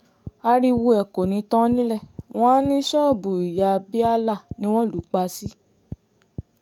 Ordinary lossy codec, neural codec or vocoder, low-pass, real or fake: none; codec, 44.1 kHz, 7.8 kbps, DAC; 19.8 kHz; fake